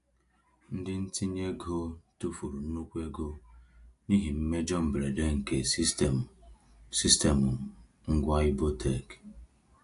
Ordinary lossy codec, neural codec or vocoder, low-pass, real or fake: AAC, 64 kbps; none; 10.8 kHz; real